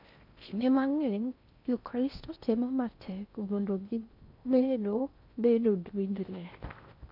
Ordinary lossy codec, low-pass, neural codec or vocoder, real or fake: none; 5.4 kHz; codec, 16 kHz in and 24 kHz out, 0.6 kbps, FocalCodec, streaming, 4096 codes; fake